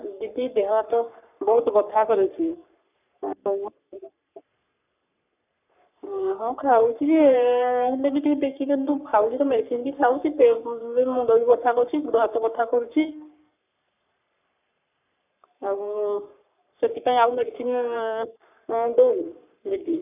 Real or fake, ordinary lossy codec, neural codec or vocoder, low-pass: fake; none; codec, 44.1 kHz, 3.4 kbps, Pupu-Codec; 3.6 kHz